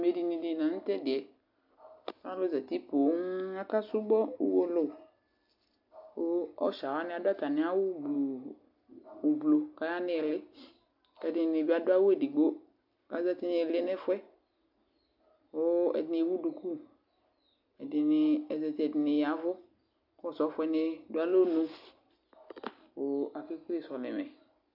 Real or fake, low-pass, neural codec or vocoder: real; 5.4 kHz; none